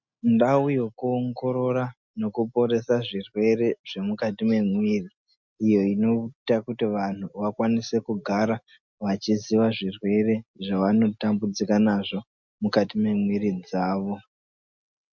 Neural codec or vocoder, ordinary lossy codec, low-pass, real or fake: none; MP3, 64 kbps; 7.2 kHz; real